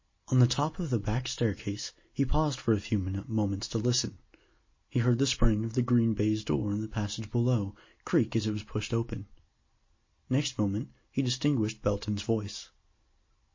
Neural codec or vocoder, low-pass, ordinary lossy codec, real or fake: none; 7.2 kHz; MP3, 32 kbps; real